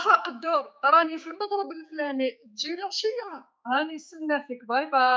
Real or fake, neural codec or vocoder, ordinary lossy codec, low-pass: fake; codec, 16 kHz, 4 kbps, X-Codec, HuBERT features, trained on general audio; none; none